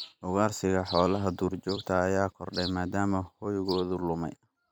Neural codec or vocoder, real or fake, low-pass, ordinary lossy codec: vocoder, 44.1 kHz, 128 mel bands every 256 samples, BigVGAN v2; fake; none; none